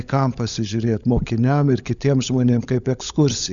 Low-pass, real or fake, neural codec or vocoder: 7.2 kHz; fake; codec, 16 kHz, 16 kbps, FunCodec, trained on LibriTTS, 50 frames a second